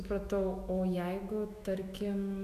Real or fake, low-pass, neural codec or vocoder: fake; 14.4 kHz; autoencoder, 48 kHz, 128 numbers a frame, DAC-VAE, trained on Japanese speech